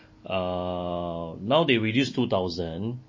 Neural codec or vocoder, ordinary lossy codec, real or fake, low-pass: none; MP3, 32 kbps; real; 7.2 kHz